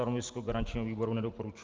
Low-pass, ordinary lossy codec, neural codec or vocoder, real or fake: 7.2 kHz; Opus, 32 kbps; none; real